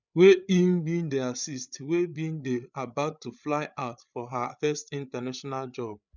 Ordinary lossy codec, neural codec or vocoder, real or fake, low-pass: none; codec, 16 kHz, 8 kbps, FreqCodec, larger model; fake; 7.2 kHz